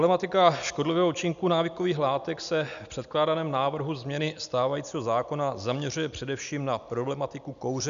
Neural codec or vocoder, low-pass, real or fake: none; 7.2 kHz; real